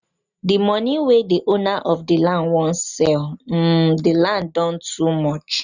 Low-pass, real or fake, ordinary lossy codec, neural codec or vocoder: 7.2 kHz; real; none; none